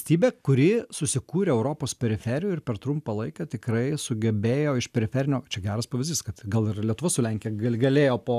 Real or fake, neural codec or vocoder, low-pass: real; none; 14.4 kHz